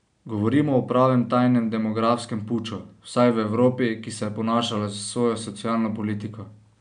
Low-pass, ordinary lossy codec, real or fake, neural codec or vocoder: 9.9 kHz; none; real; none